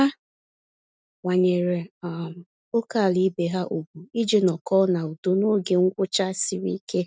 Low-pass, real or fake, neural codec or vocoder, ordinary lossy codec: none; real; none; none